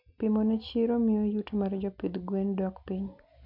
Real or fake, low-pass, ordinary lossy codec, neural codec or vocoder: real; 5.4 kHz; MP3, 48 kbps; none